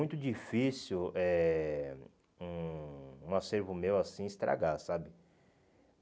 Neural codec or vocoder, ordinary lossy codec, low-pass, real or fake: none; none; none; real